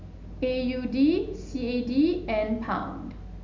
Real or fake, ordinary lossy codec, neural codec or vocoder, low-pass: real; MP3, 64 kbps; none; 7.2 kHz